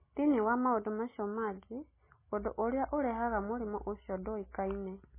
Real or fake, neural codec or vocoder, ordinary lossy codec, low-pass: real; none; MP3, 16 kbps; 3.6 kHz